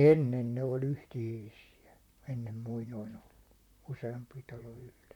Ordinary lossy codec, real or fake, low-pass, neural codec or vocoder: none; fake; 19.8 kHz; vocoder, 44.1 kHz, 128 mel bands, Pupu-Vocoder